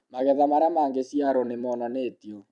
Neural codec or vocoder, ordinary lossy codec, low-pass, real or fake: vocoder, 44.1 kHz, 128 mel bands every 256 samples, BigVGAN v2; none; 10.8 kHz; fake